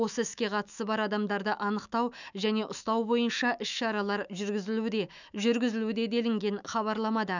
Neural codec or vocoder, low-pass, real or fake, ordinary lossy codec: autoencoder, 48 kHz, 128 numbers a frame, DAC-VAE, trained on Japanese speech; 7.2 kHz; fake; none